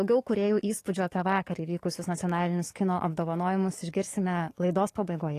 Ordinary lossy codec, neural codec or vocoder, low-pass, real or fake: AAC, 48 kbps; codec, 44.1 kHz, 7.8 kbps, DAC; 14.4 kHz; fake